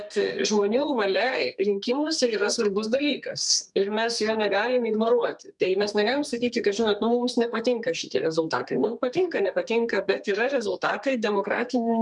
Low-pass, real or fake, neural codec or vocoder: 10.8 kHz; fake; codec, 32 kHz, 1.9 kbps, SNAC